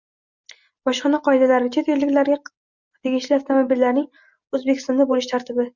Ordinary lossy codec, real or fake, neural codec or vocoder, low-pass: Opus, 64 kbps; real; none; 7.2 kHz